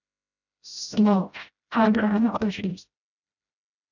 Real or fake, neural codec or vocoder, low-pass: fake; codec, 16 kHz, 0.5 kbps, FreqCodec, smaller model; 7.2 kHz